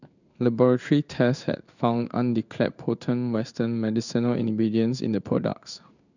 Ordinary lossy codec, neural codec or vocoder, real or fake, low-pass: none; codec, 16 kHz in and 24 kHz out, 1 kbps, XY-Tokenizer; fake; 7.2 kHz